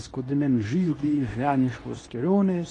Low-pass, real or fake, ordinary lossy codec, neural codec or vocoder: 10.8 kHz; fake; AAC, 32 kbps; codec, 24 kHz, 0.9 kbps, WavTokenizer, medium speech release version 2